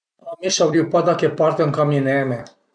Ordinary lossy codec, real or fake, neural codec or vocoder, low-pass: none; fake; vocoder, 44.1 kHz, 128 mel bands every 256 samples, BigVGAN v2; 9.9 kHz